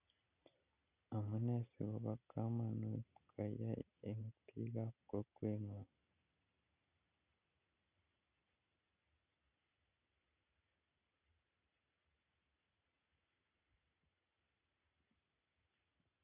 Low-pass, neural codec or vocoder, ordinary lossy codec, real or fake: 3.6 kHz; none; none; real